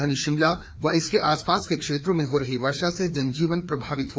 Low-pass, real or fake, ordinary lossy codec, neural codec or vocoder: none; fake; none; codec, 16 kHz, 2 kbps, FreqCodec, larger model